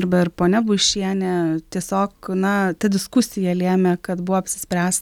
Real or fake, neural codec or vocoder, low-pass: real; none; 19.8 kHz